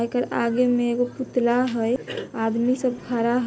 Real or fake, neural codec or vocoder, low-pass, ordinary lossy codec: real; none; none; none